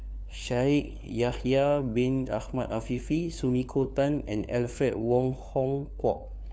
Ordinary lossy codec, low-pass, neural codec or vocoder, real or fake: none; none; codec, 16 kHz, 4 kbps, FunCodec, trained on LibriTTS, 50 frames a second; fake